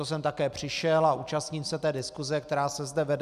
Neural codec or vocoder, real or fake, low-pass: none; real; 14.4 kHz